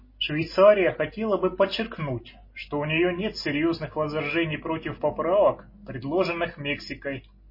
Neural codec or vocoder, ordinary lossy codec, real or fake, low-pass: none; MP3, 24 kbps; real; 5.4 kHz